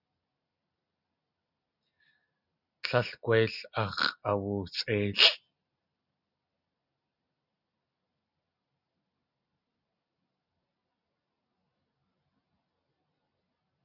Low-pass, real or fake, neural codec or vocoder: 5.4 kHz; real; none